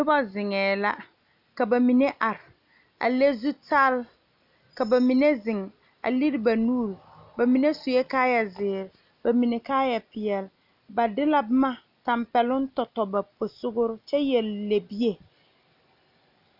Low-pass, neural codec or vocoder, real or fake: 5.4 kHz; none; real